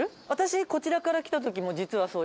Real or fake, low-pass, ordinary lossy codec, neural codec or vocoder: real; none; none; none